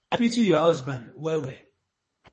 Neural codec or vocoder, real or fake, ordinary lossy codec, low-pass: codec, 24 kHz, 3 kbps, HILCodec; fake; MP3, 32 kbps; 10.8 kHz